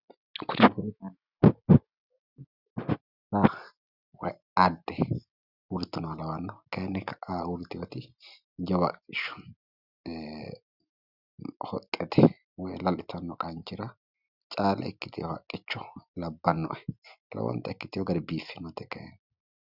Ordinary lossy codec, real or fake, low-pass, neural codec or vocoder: Opus, 64 kbps; real; 5.4 kHz; none